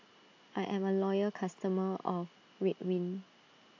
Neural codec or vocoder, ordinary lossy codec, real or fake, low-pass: none; AAC, 48 kbps; real; 7.2 kHz